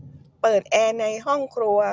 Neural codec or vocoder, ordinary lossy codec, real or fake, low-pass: none; none; real; none